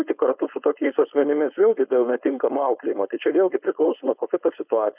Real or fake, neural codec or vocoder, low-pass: fake; codec, 16 kHz, 4.8 kbps, FACodec; 3.6 kHz